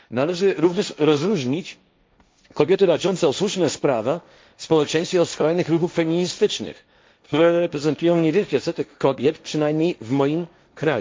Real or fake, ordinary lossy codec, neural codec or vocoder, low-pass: fake; none; codec, 16 kHz, 1.1 kbps, Voila-Tokenizer; none